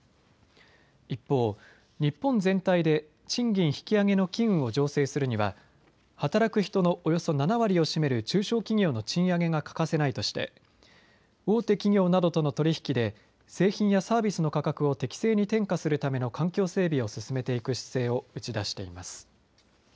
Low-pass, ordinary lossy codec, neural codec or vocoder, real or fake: none; none; none; real